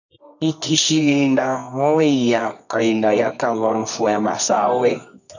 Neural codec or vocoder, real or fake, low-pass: codec, 24 kHz, 0.9 kbps, WavTokenizer, medium music audio release; fake; 7.2 kHz